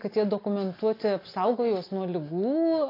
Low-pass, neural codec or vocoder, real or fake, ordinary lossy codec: 5.4 kHz; none; real; AAC, 32 kbps